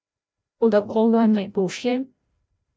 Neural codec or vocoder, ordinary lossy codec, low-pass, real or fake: codec, 16 kHz, 0.5 kbps, FreqCodec, larger model; none; none; fake